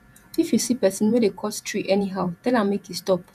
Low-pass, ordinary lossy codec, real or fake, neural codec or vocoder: 14.4 kHz; none; fake; vocoder, 48 kHz, 128 mel bands, Vocos